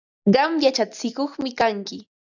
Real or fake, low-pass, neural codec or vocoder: real; 7.2 kHz; none